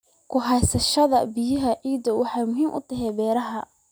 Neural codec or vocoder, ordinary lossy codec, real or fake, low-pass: none; none; real; none